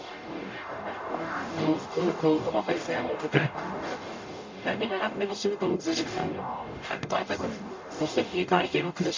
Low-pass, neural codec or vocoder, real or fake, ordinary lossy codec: 7.2 kHz; codec, 44.1 kHz, 0.9 kbps, DAC; fake; MP3, 48 kbps